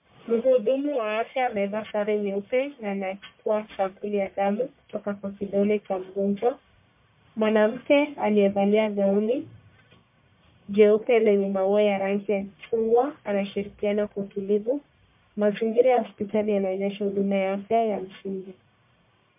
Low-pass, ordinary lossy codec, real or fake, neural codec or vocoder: 3.6 kHz; MP3, 32 kbps; fake; codec, 44.1 kHz, 1.7 kbps, Pupu-Codec